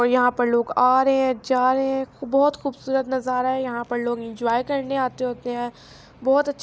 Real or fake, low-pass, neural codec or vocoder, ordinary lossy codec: real; none; none; none